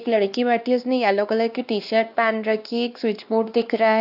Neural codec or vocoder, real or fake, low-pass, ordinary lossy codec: codec, 16 kHz, 2 kbps, X-Codec, WavLM features, trained on Multilingual LibriSpeech; fake; 5.4 kHz; AAC, 48 kbps